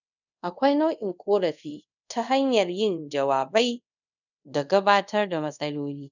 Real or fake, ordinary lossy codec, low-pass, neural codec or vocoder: fake; none; 7.2 kHz; codec, 24 kHz, 0.5 kbps, DualCodec